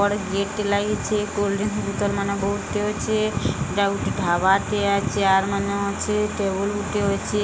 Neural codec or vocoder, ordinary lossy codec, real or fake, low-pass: none; none; real; none